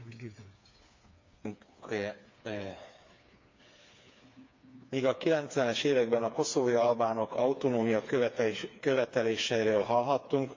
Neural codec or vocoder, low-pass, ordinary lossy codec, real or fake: codec, 16 kHz, 4 kbps, FreqCodec, smaller model; 7.2 kHz; MP3, 48 kbps; fake